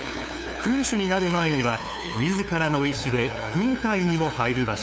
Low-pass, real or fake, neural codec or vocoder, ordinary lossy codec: none; fake; codec, 16 kHz, 2 kbps, FunCodec, trained on LibriTTS, 25 frames a second; none